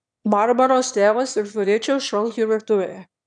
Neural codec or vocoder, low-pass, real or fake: autoencoder, 22.05 kHz, a latent of 192 numbers a frame, VITS, trained on one speaker; 9.9 kHz; fake